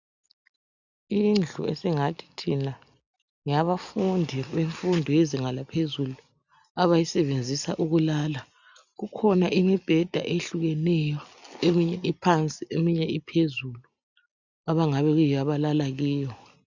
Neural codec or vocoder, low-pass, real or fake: none; 7.2 kHz; real